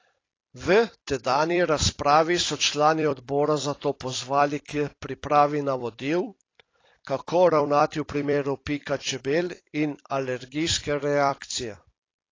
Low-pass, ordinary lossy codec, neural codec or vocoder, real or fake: 7.2 kHz; AAC, 32 kbps; vocoder, 44.1 kHz, 128 mel bands every 256 samples, BigVGAN v2; fake